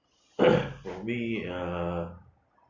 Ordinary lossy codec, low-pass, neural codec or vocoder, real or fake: Opus, 64 kbps; 7.2 kHz; none; real